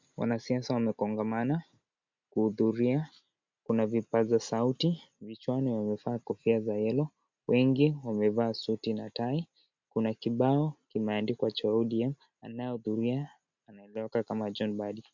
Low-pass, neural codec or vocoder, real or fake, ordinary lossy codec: 7.2 kHz; none; real; MP3, 64 kbps